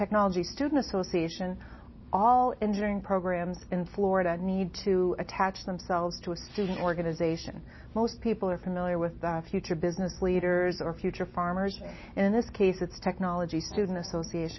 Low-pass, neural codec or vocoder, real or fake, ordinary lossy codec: 7.2 kHz; none; real; MP3, 24 kbps